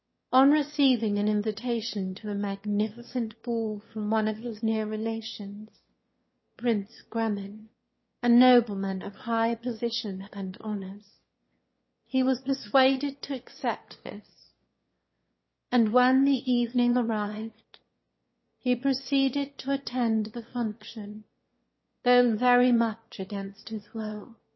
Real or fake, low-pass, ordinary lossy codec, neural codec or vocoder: fake; 7.2 kHz; MP3, 24 kbps; autoencoder, 22.05 kHz, a latent of 192 numbers a frame, VITS, trained on one speaker